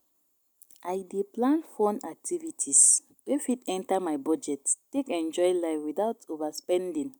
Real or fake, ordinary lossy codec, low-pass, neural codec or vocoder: real; none; none; none